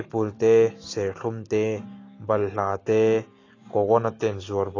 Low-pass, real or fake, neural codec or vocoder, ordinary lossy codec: 7.2 kHz; real; none; AAC, 32 kbps